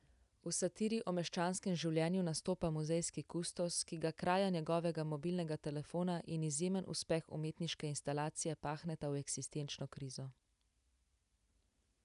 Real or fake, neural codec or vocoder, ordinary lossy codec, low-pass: real; none; none; 10.8 kHz